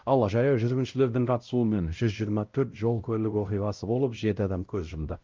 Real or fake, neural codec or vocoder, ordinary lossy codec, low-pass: fake; codec, 16 kHz, 0.5 kbps, X-Codec, WavLM features, trained on Multilingual LibriSpeech; Opus, 32 kbps; 7.2 kHz